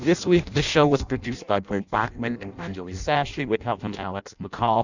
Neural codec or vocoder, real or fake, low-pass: codec, 16 kHz in and 24 kHz out, 0.6 kbps, FireRedTTS-2 codec; fake; 7.2 kHz